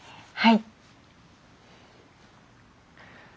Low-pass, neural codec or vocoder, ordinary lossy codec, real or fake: none; none; none; real